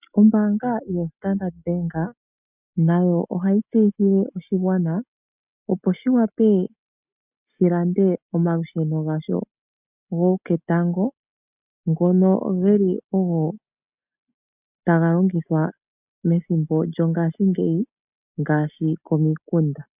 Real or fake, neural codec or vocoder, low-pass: real; none; 3.6 kHz